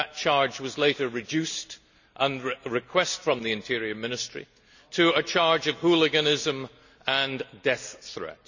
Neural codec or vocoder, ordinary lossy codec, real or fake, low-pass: none; none; real; 7.2 kHz